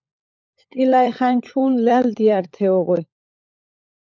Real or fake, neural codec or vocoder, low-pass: fake; codec, 16 kHz, 16 kbps, FunCodec, trained on LibriTTS, 50 frames a second; 7.2 kHz